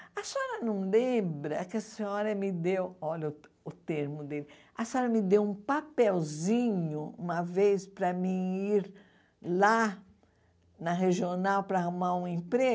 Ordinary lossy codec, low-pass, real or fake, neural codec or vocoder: none; none; real; none